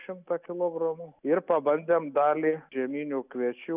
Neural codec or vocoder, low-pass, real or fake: none; 3.6 kHz; real